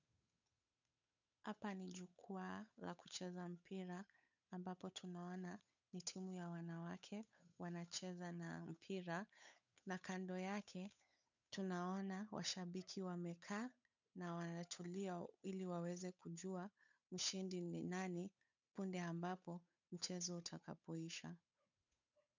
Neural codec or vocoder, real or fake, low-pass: codec, 16 kHz, 8 kbps, FunCodec, trained on Chinese and English, 25 frames a second; fake; 7.2 kHz